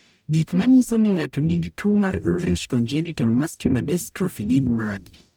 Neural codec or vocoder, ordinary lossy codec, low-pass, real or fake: codec, 44.1 kHz, 0.9 kbps, DAC; none; none; fake